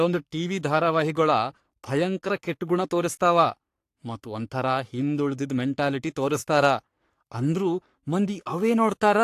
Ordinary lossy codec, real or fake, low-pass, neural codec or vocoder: AAC, 64 kbps; fake; 14.4 kHz; codec, 44.1 kHz, 3.4 kbps, Pupu-Codec